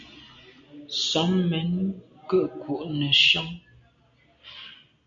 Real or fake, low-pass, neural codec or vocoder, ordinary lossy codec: real; 7.2 kHz; none; MP3, 64 kbps